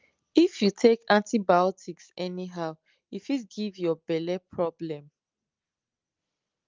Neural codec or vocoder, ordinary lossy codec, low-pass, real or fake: none; Opus, 24 kbps; 7.2 kHz; real